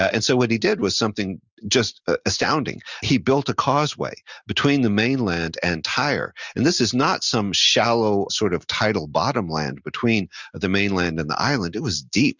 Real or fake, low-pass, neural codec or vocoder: real; 7.2 kHz; none